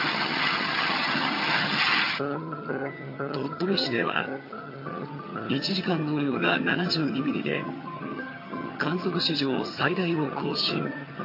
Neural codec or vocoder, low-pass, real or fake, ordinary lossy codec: vocoder, 22.05 kHz, 80 mel bands, HiFi-GAN; 5.4 kHz; fake; MP3, 32 kbps